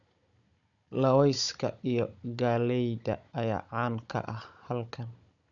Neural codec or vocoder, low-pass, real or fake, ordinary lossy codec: codec, 16 kHz, 16 kbps, FunCodec, trained on Chinese and English, 50 frames a second; 7.2 kHz; fake; none